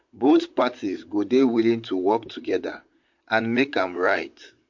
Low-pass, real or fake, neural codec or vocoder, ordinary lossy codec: 7.2 kHz; fake; vocoder, 44.1 kHz, 128 mel bands, Pupu-Vocoder; MP3, 48 kbps